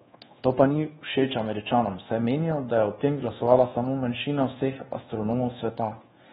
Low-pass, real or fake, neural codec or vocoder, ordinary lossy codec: 10.8 kHz; fake; codec, 24 kHz, 1.2 kbps, DualCodec; AAC, 16 kbps